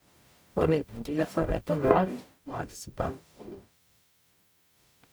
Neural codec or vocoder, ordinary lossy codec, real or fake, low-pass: codec, 44.1 kHz, 0.9 kbps, DAC; none; fake; none